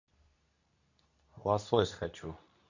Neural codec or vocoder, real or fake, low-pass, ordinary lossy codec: codec, 24 kHz, 0.9 kbps, WavTokenizer, medium speech release version 2; fake; 7.2 kHz; none